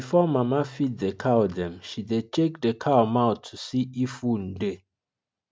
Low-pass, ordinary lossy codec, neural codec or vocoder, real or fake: none; none; none; real